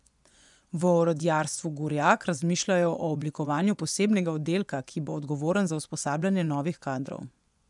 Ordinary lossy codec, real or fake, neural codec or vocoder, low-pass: none; fake; vocoder, 24 kHz, 100 mel bands, Vocos; 10.8 kHz